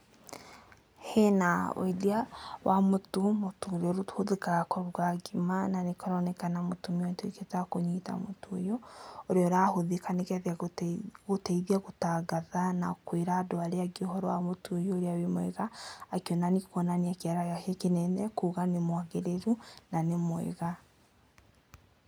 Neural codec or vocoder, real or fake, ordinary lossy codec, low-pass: none; real; none; none